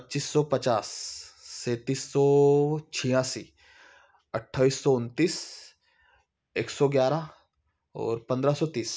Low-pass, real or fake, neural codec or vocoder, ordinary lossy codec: none; real; none; none